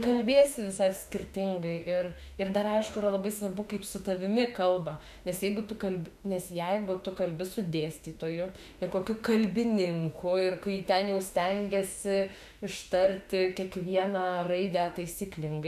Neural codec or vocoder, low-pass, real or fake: autoencoder, 48 kHz, 32 numbers a frame, DAC-VAE, trained on Japanese speech; 14.4 kHz; fake